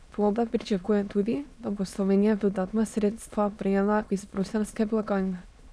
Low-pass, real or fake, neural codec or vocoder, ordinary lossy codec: none; fake; autoencoder, 22.05 kHz, a latent of 192 numbers a frame, VITS, trained on many speakers; none